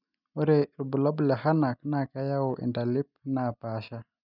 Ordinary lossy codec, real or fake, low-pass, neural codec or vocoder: none; real; 5.4 kHz; none